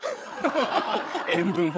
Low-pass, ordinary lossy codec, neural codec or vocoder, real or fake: none; none; codec, 16 kHz, 8 kbps, FreqCodec, larger model; fake